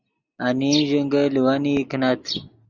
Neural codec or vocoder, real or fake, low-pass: none; real; 7.2 kHz